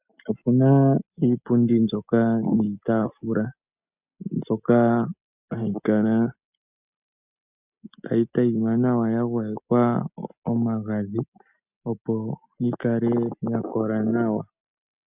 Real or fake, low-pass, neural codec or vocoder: real; 3.6 kHz; none